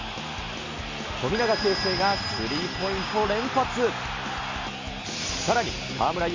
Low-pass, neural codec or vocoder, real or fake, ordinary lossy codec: 7.2 kHz; none; real; MP3, 64 kbps